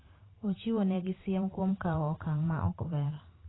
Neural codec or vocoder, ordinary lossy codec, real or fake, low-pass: vocoder, 44.1 kHz, 128 mel bands every 512 samples, BigVGAN v2; AAC, 16 kbps; fake; 7.2 kHz